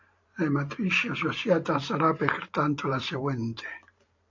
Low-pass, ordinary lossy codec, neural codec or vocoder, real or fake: 7.2 kHz; AAC, 48 kbps; vocoder, 44.1 kHz, 128 mel bands every 512 samples, BigVGAN v2; fake